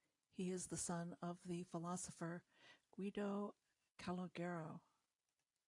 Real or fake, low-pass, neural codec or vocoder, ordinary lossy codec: real; 10.8 kHz; none; AAC, 48 kbps